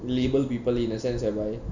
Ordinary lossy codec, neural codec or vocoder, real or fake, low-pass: none; none; real; 7.2 kHz